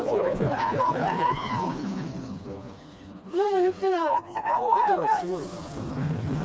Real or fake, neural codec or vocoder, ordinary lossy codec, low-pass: fake; codec, 16 kHz, 2 kbps, FreqCodec, smaller model; none; none